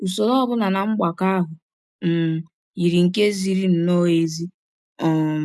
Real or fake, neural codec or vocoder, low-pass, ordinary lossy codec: real; none; none; none